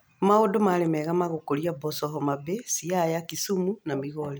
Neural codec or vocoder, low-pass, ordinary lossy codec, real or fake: none; none; none; real